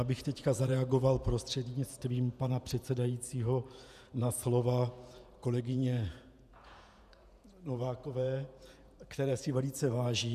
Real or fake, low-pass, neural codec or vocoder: fake; 14.4 kHz; vocoder, 48 kHz, 128 mel bands, Vocos